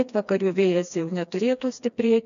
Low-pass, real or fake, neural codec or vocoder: 7.2 kHz; fake; codec, 16 kHz, 2 kbps, FreqCodec, smaller model